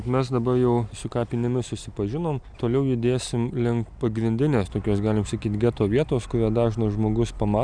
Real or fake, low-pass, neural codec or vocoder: real; 9.9 kHz; none